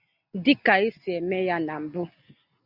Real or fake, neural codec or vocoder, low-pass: real; none; 5.4 kHz